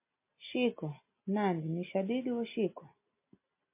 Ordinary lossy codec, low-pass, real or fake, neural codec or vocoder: MP3, 24 kbps; 3.6 kHz; real; none